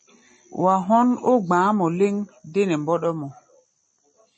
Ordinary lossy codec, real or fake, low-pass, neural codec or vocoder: MP3, 32 kbps; real; 10.8 kHz; none